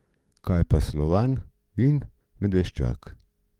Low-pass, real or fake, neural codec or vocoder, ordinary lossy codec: 19.8 kHz; fake; codec, 44.1 kHz, 7.8 kbps, DAC; Opus, 24 kbps